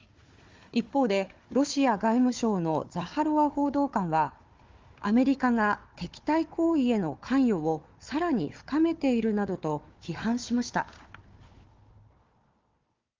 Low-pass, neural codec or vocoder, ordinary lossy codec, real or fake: 7.2 kHz; codec, 16 kHz, 4 kbps, FunCodec, trained on Chinese and English, 50 frames a second; Opus, 32 kbps; fake